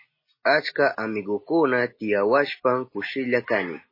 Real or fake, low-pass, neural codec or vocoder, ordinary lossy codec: real; 5.4 kHz; none; MP3, 24 kbps